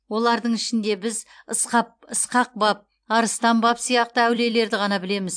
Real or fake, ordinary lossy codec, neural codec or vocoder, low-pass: fake; AAC, 64 kbps; vocoder, 44.1 kHz, 128 mel bands every 256 samples, BigVGAN v2; 9.9 kHz